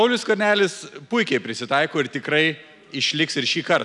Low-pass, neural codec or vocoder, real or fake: 10.8 kHz; none; real